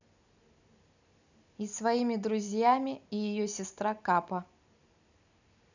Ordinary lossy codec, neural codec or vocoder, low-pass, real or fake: none; none; 7.2 kHz; real